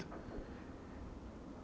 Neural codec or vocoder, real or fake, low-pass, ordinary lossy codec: none; real; none; none